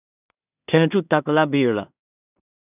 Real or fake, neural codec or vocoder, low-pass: fake; codec, 16 kHz in and 24 kHz out, 0.4 kbps, LongCat-Audio-Codec, two codebook decoder; 3.6 kHz